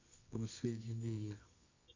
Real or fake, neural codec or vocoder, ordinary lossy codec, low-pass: fake; codec, 24 kHz, 0.9 kbps, WavTokenizer, medium music audio release; MP3, 64 kbps; 7.2 kHz